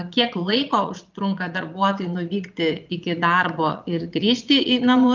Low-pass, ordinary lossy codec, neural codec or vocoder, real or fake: 7.2 kHz; Opus, 32 kbps; vocoder, 22.05 kHz, 80 mel bands, Vocos; fake